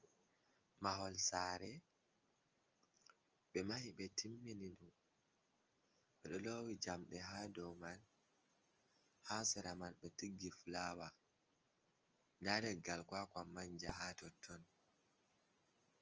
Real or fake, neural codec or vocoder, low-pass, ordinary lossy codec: real; none; 7.2 kHz; Opus, 24 kbps